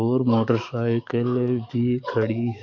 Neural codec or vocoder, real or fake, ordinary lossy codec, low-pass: codec, 44.1 kHz, 7.8 kbps, DAC; fake; none; 7.2 kHz